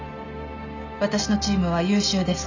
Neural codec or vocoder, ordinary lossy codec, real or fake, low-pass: none; none; real; 7.2 kHz